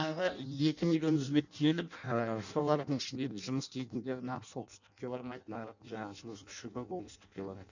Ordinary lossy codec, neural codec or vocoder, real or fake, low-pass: none; codec, 16 kHz in and 24 kHz out, 0.6 kbps, FireRedTTS-2 codec; fake; 7.2 kHz